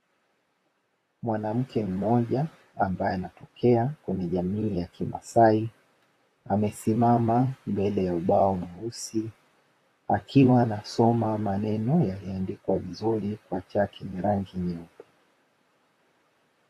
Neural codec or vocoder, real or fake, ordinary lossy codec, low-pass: vocoder, 44.1 kHz, 128 mel bands, Pupu-Vocoder; fake; AAC, 48 kbps; 14.4 kHz